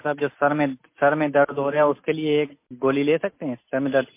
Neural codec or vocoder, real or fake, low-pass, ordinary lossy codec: none; real; 3.6 kHz; MP3, 24 kbps